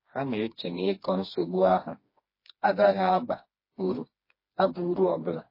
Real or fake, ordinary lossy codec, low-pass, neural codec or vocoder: fake; MP3, 24 kbps; 5.4 kHz; codec, 16 kHz, 2 kbps, FreqCodec, smaller model